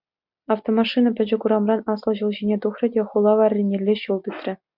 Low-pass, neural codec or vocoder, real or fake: 5.4 kHz; none; real